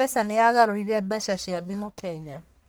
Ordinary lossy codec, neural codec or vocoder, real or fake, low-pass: none; codec, 44.1 kHz, 1.7 kbps, Pupu-Codec; fake; none